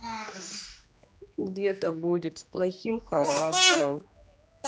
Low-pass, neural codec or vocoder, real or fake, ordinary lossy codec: none; codec, 16 kHz, 1 kbps, X-Codec, HuBERT features, trained on general audio; fake; none